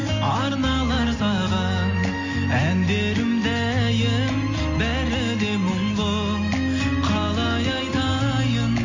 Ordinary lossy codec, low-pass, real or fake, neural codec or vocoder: AAC, 48 kbps; 7.2 kHz; real; none